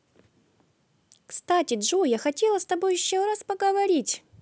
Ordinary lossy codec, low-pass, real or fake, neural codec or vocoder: none; none; real; none